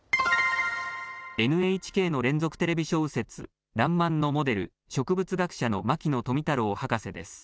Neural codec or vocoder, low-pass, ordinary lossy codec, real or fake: none; none; none; real